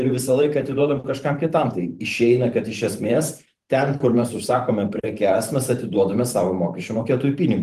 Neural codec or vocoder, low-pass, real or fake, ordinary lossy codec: vocoder, 44.1 kHz, 128 mel bands every 512 samples, BigVGAN v2; 14.4 kHz; fake; Opus, 24 kbps